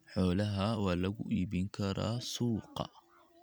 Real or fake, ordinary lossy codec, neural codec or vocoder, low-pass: real; none; none; none